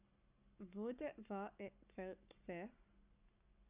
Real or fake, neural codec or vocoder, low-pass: fake; codec, 44.1 kHz, 7.8 kbps, Pupu-Codec; 3.6 kHz